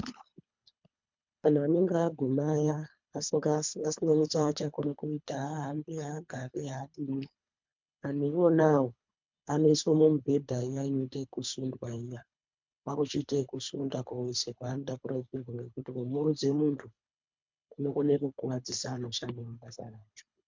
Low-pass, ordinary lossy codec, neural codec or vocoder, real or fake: 7.2 kHz; MP3, 64 kbps; codec, 24 kHz, 3 kbps, HILCodec; fake